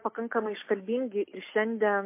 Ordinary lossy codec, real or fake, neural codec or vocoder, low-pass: MP3, 24 kbps; real; none; 3.6 kHz